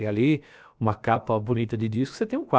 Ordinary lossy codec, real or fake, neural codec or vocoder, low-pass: none; fake; codec, 16 kHz, about 1 kbps, DyCAST, with the encoder's durations; none